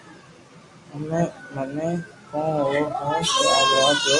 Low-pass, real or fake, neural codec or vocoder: 10.8 kHz; real; none